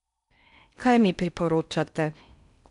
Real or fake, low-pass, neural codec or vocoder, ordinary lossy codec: fake; 10.8 kHz; codec, 16 kHz in and 24 kHz out, 0.6 kbps, FocalCodec, streaming, 4096 codes; none